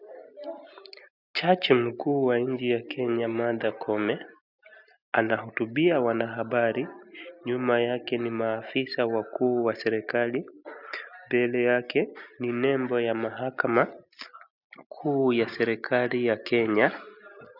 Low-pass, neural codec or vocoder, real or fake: 5.4 kHz; none; real